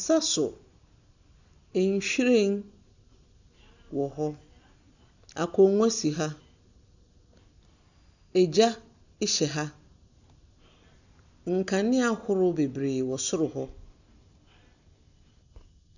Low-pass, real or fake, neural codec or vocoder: 7.2 kHz; real; none